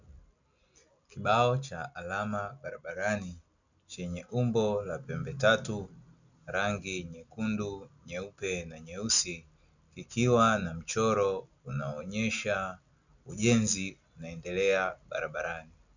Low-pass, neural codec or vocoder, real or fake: 7.2 kHz; none; real